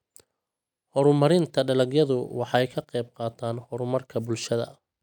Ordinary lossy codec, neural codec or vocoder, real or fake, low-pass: none; none; real; 19.8 kHz